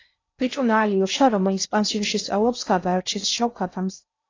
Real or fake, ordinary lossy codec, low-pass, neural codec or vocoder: fake; AAC, 32 kbps; 7.2 kHz; codec, 16 kHz in and 24 kHz out, 0.6 kbps, FocalCodec, streaming, 2048 codes